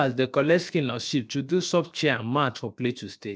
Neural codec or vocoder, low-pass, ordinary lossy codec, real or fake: codec, 16 kHz, 0.7 kbps, FocalCodec; none; none; fake